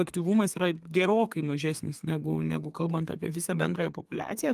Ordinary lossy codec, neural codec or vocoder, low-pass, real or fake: Opus, 32 kbps; codec, 32 kHz, 1.9 kbps, SNAC; 14.4 kHz; fake